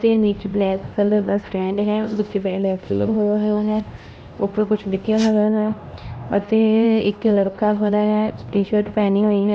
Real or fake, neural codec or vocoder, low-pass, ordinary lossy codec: fake; codec, 16 kHz, 1 kbps, X-Codec, HuBERT features, trained on LibriSpeech; none; none